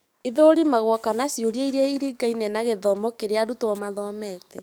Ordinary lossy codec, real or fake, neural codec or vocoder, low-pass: none; fake; codec, 44.1 kHz, 7.8 kbps, DAC; none